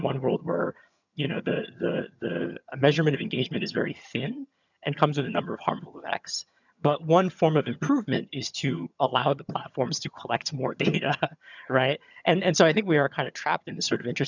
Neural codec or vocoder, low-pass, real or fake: vocoder, 22.05 kHz, 80 mel bands, HiFi-GAN; 7.2 kHz; fake